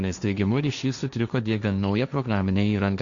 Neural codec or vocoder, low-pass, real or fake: codec, 16 kHz, 1.1 kbps, Voila-Tokenizer; 7.2 kHz; fake